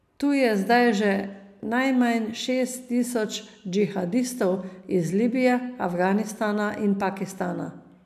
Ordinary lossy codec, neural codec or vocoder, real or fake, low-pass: none; none; real; 14.4 kHz